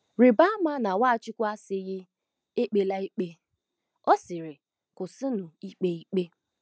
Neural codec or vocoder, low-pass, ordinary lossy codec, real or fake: none; none; none; real